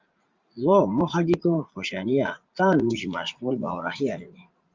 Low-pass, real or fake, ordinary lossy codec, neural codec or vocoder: 7.2 kHz; fake; Opus, 32 kbps; vocoder, 44.1 kHz, 80 mel bands, Vocos